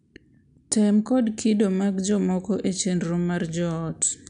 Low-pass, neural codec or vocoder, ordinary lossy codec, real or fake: 9.9 kHz; none; none; real